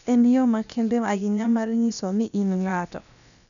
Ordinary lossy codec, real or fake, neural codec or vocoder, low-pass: none; fake; codec, 16 kHz, about 1 kbps, DyCAST, with the encoder's durations; 7.2 kHz